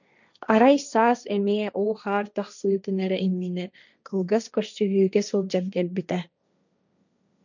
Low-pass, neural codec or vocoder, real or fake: 7.2 kHz; codec, 16 kHz, 1.1 kbps, Voila-Tokenizer; fake